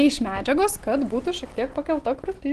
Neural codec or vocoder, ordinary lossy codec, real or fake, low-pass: none; Opus, 16 kbps; real; 10.8 kHz